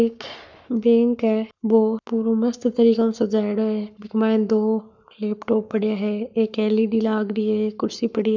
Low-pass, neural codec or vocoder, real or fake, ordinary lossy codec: 7.2 kHz; codec, 44.1 kHz, 7.8 kbps, Pupu-Codec; fake; none